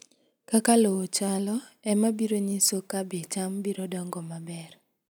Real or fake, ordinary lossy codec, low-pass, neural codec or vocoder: real; none; none; none